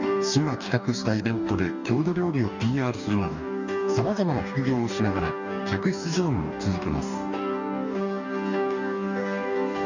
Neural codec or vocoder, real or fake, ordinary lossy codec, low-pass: codec, 44.1 kHz, 2.6 kbps, DAC; fake; none; 7.2 kHz